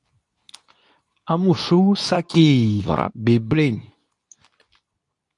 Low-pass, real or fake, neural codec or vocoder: 10.8 kHz; fake; codec, 24 kHz, 0.9 kbps, WavTokenizer, medium speech release version 2